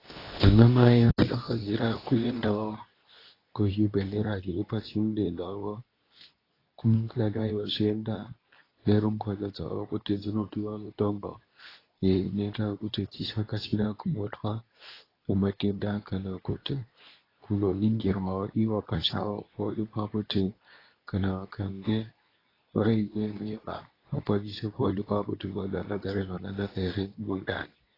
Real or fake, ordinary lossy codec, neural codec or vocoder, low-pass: fake; AAC, 24 kbps; codec, 24 kHz, 0.9 kbps, WavTokenizer, medium speech release version 2; 5.4 kHz